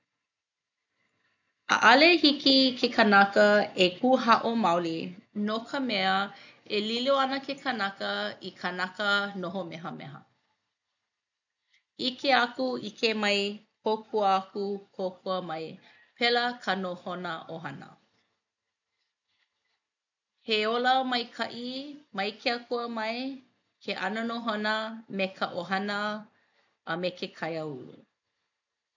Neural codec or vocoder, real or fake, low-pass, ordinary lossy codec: none; real; 7.2 kHz; none